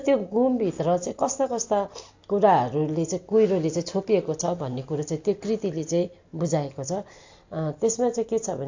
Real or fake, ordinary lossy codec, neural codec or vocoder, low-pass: real; AAC, 48 kbps; none; 7.2 kHz